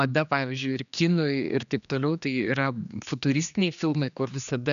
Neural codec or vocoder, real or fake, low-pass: codec, 16 kHz, 2 kbps, X-Codec, HuBERT features, trained on general audio; fake; 7.2 kHz